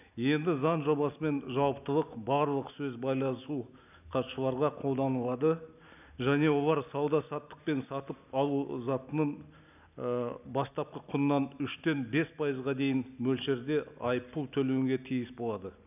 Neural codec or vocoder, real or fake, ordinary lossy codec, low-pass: none; real; none; 3.6 kHz